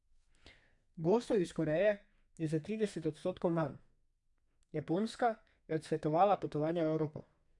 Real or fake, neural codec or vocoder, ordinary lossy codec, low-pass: fake; codec, 32 kHz, 1.9 kbps, SNAC; none; 10.8 kHz